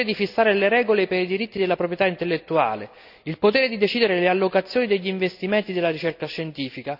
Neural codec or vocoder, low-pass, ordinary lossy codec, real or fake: none; 5.4 kHz; none; real